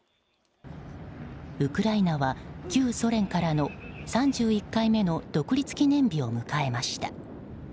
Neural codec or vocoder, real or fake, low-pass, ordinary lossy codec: none; real; none; none